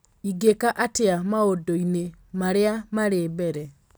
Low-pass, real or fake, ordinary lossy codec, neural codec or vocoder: none; real; none; none